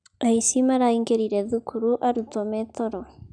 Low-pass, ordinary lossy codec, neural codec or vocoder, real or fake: 9.9 kHz; none; none; real